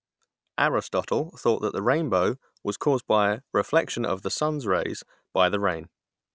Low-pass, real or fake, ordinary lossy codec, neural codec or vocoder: none; real; none; none